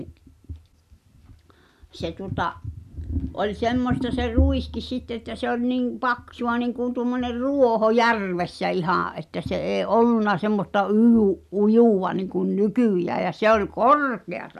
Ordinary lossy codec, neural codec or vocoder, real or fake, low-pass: none; none; real; 14.4 kHz